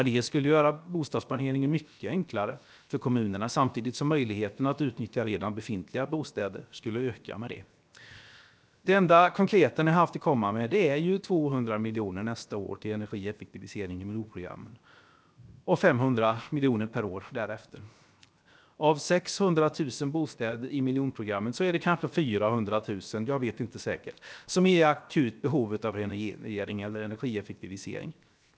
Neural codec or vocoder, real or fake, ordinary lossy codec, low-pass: codec, 16 kHz, 0.7 kbps, FocalCodec; fake; none; none